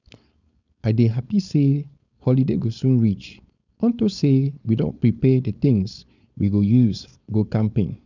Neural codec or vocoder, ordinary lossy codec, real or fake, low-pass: codec, 16 kHz, 4.8 kbps, FACodec; none; fake; 7.2 kHz